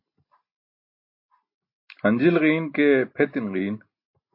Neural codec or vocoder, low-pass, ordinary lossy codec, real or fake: none; 5.4 kHz; MP3, 32 kbps; real